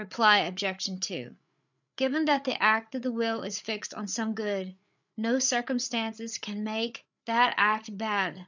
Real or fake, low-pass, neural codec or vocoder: fake; 7.2 kHz; codec, 16 kHz, 8 kbps, FreqCodec, larger model